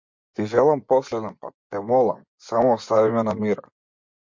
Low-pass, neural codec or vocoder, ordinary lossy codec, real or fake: 7.2 kHz; vocoder, 24 kHz, 100 mel bands, Vocos; MP3, 48 kbps; fake